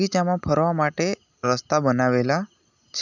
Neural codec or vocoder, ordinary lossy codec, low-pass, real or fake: none; none; 7.2 kHz; real